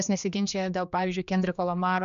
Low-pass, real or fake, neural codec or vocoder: 7.2 kHz; fake; codec, 16 kHz, 2 kbps, X-Codec, HuBERT features, trained on general audio